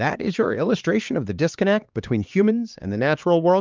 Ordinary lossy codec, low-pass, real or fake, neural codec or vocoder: Opus, 24 kbps; 7.2 kHz; fake; codec, 16 kHz, 2 kbps, X-Codec, HuBERT features, trained on LibriSpeech